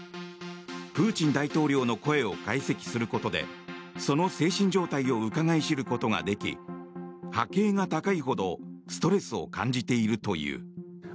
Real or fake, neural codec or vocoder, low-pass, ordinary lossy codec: real; none; none; none